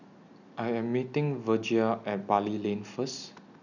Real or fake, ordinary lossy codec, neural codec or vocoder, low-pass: real; none; none; 7.2 kHz